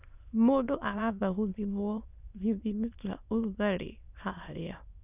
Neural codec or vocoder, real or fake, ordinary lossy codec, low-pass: autoencoder, 22.05 kHz, a latent of 192 numbers a frame, VITS, trained on many speakers; fake; none; 3.6 kHz